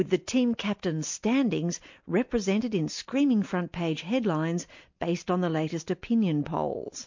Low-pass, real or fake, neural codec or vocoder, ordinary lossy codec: 7.2 kHz; real; none; MP3, 48 kbps